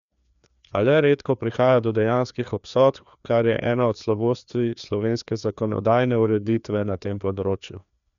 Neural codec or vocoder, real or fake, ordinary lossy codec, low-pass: codec, 16 kHz, 2 kbps, FreqCodec, larger model; fake; none; 7.2 kHz